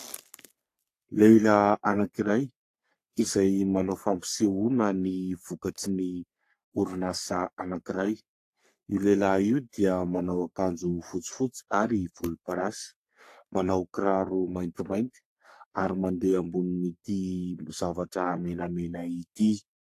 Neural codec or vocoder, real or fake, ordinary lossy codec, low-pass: codec, 44.1 kHz, 3.4 kbps, Pupu-Codec; fake; AAC, 64 kbps; 14.4 kHz